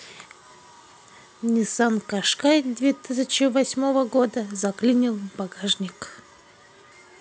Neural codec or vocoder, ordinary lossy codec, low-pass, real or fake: none; none; none; real